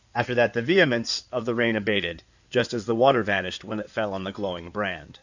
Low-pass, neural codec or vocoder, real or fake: 7.2 kHz; codec, 16 kHz in and 24 kHz out, 2.2 kbps, FireRedTTS-2 codec; fake